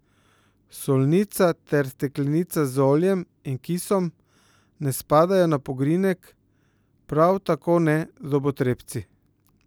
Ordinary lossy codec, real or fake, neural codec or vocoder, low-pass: none; real; none; none